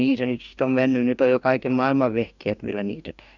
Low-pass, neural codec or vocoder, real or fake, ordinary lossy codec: 7.2 kHz; codec, 44.1 kHz, 2.6 kbps, DAC; fake; none